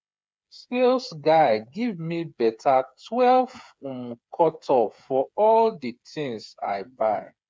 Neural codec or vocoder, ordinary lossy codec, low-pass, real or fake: codec, 16 kHz, 8 kbps, FreqCodec, smaller model; none; none; fake